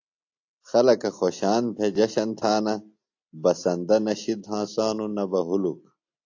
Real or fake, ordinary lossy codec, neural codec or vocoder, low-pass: real; AAC, 48 kbps; none; 7.2 kHz